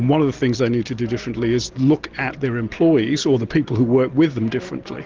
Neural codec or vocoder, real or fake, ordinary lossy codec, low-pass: none; real; Opus, 16 kbps; 7.2 kHz